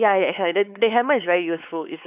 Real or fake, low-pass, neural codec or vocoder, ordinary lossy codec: fake; 3.6 kHz; codec, 16 kHz, 4 kbps, X-Codec, WavLM features, trained on Multilingual LibriSpeech; none